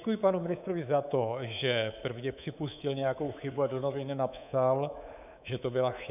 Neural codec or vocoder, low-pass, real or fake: codec, 24 kHz, 3.1 kbps, DualCodec; 3.6 kHz; fake